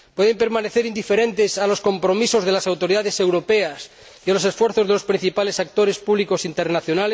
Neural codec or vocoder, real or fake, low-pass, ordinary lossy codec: none; real; none; none